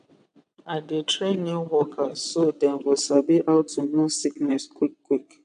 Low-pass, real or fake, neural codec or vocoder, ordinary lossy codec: 9.9 kHz; fake; vocoder, 22.05 kHz, 80 mel bands, WaveNeXt; AAC, 96 kbps